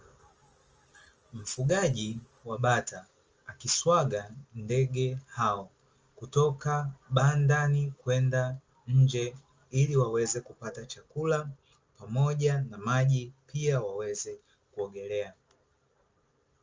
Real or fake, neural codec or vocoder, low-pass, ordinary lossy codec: real; none; 7.2 kHz; Opus, 24 kbps